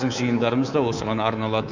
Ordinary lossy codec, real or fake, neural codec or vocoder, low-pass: none; fake; codec, 24 kHz, 3.1 kbps, DualCodec; 7.2 kHz